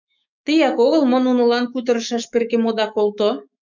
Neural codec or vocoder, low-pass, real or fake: autoencoder, 48 kHz, 128 numbers a frame, DAC-VAE, trained on Japanese speech; 7.2 kHz; fake